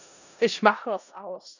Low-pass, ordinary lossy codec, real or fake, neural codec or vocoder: 7.2 kHz; none; fake; codec, 16 kHz in and 24 kHz out, 0.4 kbps, LongCat-Audio-Codec, four codebook decoder